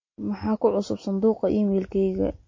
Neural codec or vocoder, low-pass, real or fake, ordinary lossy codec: none; 7.2 kHz; real; MP3, 32 kbps